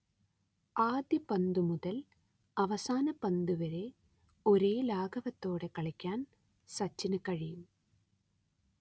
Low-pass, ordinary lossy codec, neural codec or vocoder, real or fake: none; none; none; real